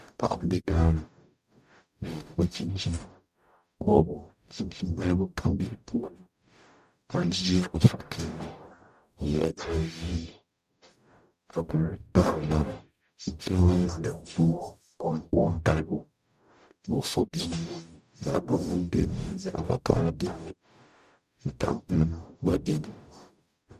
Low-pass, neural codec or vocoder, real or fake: 14.4 kHz; codec, 44.1 kHz, 0.9 kbps, DAC; fake